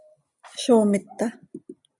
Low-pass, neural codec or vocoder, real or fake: 10.8 kHz; none; real